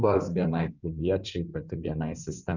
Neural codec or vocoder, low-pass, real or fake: codec, 16 kHz in and 24 kHz out, 2.2 kbps, FireRedTTS-2 codec; 7.2 kHz; fake